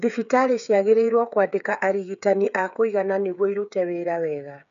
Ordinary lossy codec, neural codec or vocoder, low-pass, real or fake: none; codec, 16 kHz, 8 kbps, FreqCodec, smaller model; 7.2 kHz; fake